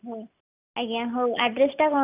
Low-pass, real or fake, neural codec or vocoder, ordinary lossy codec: 3.6 kHz; real; none; none